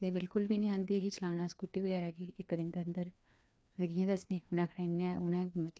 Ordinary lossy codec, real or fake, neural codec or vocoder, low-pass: none; fake; codec, 16 kHz, 2 kbps, FreqCodec, larger model; none